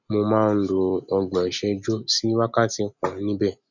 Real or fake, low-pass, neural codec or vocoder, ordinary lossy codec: real; 7.2 kHz; none; none